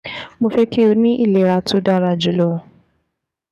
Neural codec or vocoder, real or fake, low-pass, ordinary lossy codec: codec, 44.1 kHz, 7.8 kbps, DAC; fake; 14.4 kHz; none